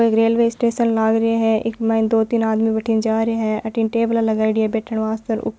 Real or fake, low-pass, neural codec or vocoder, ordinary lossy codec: real; none; none; none